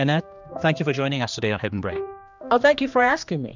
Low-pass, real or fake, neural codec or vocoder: 7.2 kHz; fake; codec, 16 kHz, 2 kbps, X-Codec, HuBERT features, trained on general audio